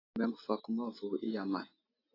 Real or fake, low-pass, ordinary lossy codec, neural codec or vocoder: real; 5.4 kHz; AAC, 24 kbps; none